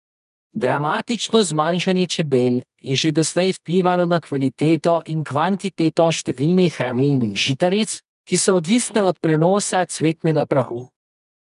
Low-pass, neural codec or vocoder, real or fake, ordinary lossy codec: 10.8 kHz; codec, 24 kHz, 0.9 kbps, WavTokenizer, medium music audio release; fake; none